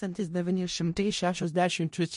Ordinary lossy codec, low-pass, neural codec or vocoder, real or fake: MP3, 48 kbps; 10.8 kHz; codec, 16 kHz in and 24 kHz out, 0.4 kbps, LongCat-Audio-Codec, four codebook decoder; fake